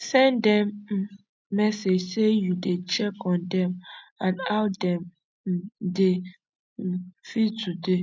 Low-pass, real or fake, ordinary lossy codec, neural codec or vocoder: none; real; none; none